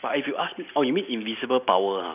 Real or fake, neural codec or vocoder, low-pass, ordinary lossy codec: real; none; 3.6 kHz; none